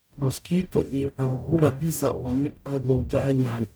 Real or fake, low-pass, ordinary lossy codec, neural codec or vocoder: fake; none; none; codec, 44.1 kHz, 0.9 kbps, DAC